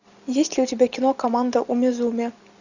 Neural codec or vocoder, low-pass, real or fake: none; 7.2 kHz; real